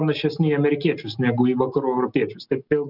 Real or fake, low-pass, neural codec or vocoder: real; 5.4 kHz; none